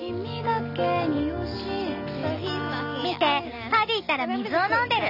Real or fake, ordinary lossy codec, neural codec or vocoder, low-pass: real; none; none; 5.4 kHz